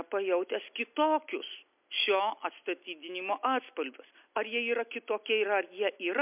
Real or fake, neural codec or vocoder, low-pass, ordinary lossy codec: real; none; 3.6 kHz; MP3, 32 kbps